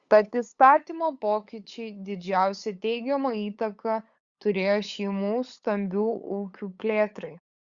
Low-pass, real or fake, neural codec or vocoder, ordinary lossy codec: 7.2 kHz; fake; codec, 16 kHz, 8 kbps, FunCodec, trained on LibriTTS, 25 frames a second; Opus, 64 kbps